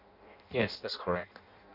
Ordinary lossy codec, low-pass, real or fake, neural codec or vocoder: none; 5.4 kHz; fake; codec, 16 kHz in and 24 kHz out, 0.6 kbps, FireRedTTS-2 codec